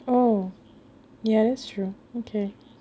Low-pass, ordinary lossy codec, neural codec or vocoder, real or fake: none; none; none; real